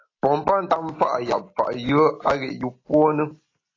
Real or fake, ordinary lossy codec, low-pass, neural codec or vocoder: real; AAC, 32 kbps; 7.2 kHz; none